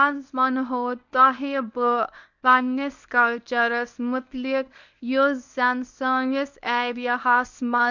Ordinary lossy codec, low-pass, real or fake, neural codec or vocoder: none; 7.2 kHz; fake; codec, 24 kHz, 0.9 kbps, WavTokenizer, medium speech release version 1